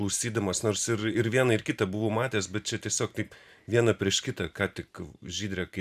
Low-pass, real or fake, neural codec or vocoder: 14.4 kHz; fake; vocoder, 44.1 kHz, 128 mel bands every 512 samples, BigVGAN v2